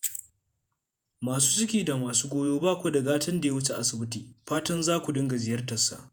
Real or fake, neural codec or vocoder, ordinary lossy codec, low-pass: fake; vocoder, 48 kHz, 128 mel bands, Vocos; none; none